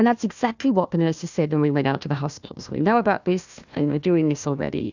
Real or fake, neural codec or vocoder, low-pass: fake; codec, 16 kHz, 1 kbps, FunCodec, trained on Chinese and English, 50 frames a second; 7.2 kHz